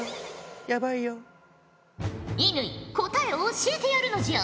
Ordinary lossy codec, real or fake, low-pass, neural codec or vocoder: none; real; none; none